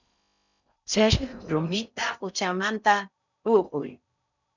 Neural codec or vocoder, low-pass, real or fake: codec, 16 kHz in and 24 kHz out, 0.6 kbps, FocalCodec, streaming, 4096 codes; 7.2 kHz; fake